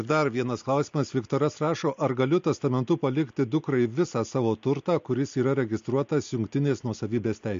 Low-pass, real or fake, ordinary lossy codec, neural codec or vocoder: 7.2 kHz; real; MP3, 48 kbps; none